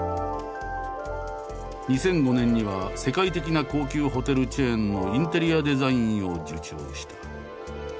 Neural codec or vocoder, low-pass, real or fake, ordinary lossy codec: none; none; real; none